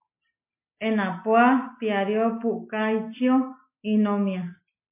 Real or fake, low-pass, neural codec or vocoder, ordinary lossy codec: real; 3.6 kHz; none; MP3, 24 kbps